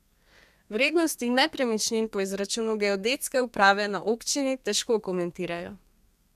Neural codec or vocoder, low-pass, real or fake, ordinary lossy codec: codec, 32 kHz, 1.9 kbps, SNAC; 14.4 kHz; fake; none